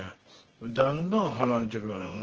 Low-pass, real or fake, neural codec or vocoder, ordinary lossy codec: 7.2 kHz; fake; codec, 24 kHz, 0.9 kbps, WavTokenizer, medium music audio release; Opus, 16 kbps